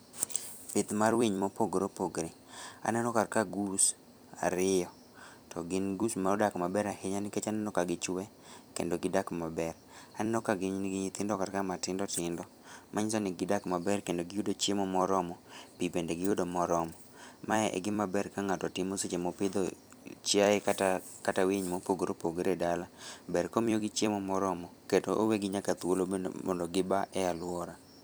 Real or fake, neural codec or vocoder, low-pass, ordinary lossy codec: fake; vocoder, 44.1 kHz, 128 mel bands every 256 samples, BigVGAN v2; none; none